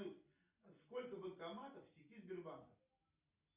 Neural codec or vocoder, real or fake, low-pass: none; real; 3.6 kHz